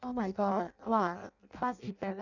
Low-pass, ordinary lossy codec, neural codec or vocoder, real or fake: 7.2 kHz; none; codec, 16 kHz in and 24 kHz out, 0.6 kbps, FireRedTTS-2 codec; fake